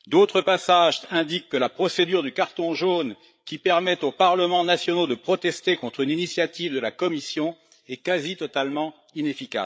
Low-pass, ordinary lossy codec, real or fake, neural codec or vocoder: none; none; fake; codec, 16 kHz, 8 kbps, FreqCodec, larger model